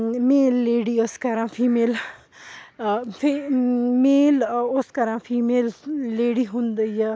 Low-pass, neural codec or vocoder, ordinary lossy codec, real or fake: none; none; none; real